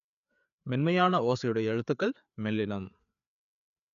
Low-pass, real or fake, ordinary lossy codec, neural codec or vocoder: 7.2 kHz; fake; none; codec, 16 kHz, 4 kbps, FreqCodec, larger model